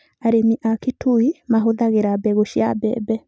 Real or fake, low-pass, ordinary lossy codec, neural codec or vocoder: real; none; none; none